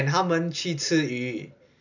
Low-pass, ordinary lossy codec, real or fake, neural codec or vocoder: 7.2 kHz; none; real; none